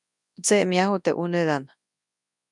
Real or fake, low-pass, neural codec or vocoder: fake; 10.8 kHz; codec, 24 kHz, 0.9 kbps, WavTokenizer, large speech release